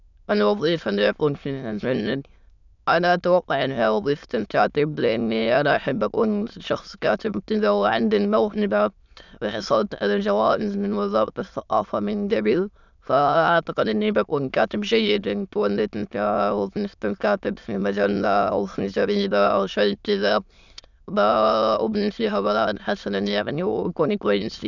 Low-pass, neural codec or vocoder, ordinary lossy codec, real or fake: 7.2 kHz; autoencoder, 22.05 kHz, a latent of 192 numbers a frame, VITS, trained on many speakers; none; fake